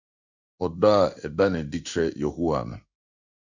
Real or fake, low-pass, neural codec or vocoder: fake; 7.2 kHz; codec, 16 kHz in and 24 kHz out, 1 kbps, XY-Tokenizer